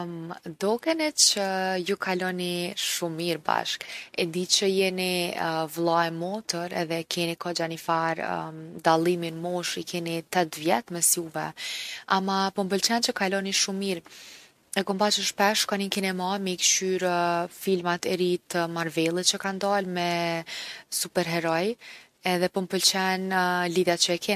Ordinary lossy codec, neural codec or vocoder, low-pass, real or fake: MP3, 64 kbps; none; 14.4 kHz; real